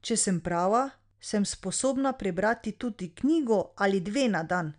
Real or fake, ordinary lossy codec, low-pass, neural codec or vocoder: real; MP3, 96 kbps; 9.9 kHz; none